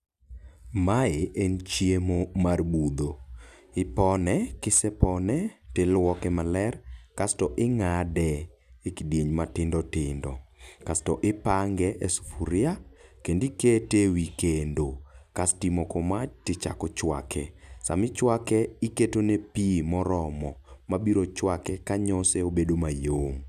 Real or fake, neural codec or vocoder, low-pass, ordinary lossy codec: real; none; 14.4 kHz; none